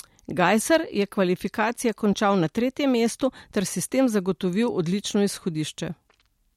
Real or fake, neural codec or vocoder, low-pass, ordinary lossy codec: real; none; 19.8 kHz; MP3, 64 kbps